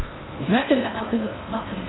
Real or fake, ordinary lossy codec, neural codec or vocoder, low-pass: fake; AAC, 16 kbps; codec, 16 kHz in and 24 kHz out, 0.6 kbps, FocalCodec, streaming, 4096 codes; 7.2 kHz